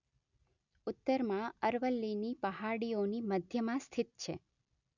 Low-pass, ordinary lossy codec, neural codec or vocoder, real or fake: 7.2 kHz; none; none; real